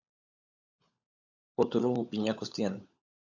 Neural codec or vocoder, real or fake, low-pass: codec, 16 kHz, 16 kbps, FunCodec, trained on LibriTTS, 50 frames a second; fake; 7.2 kHz